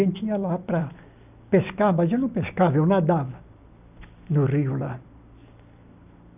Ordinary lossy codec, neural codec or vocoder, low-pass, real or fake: none; none; 3.6 kHz; real